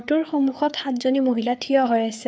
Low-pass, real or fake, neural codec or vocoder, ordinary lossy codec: none; fake; codec, 16 kHz, 4 kbps, FreqCodec, larger model; none